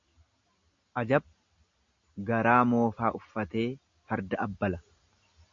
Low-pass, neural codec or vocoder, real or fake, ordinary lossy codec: 7.2 kHz; none; real; MP3, 48 kbps